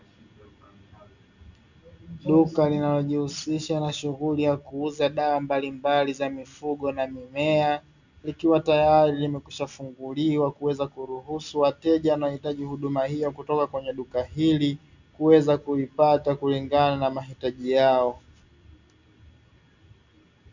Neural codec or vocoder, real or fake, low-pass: none; real; 7.2 kHz